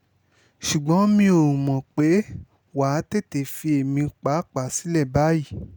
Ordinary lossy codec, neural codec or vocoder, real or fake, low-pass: none; none; real; none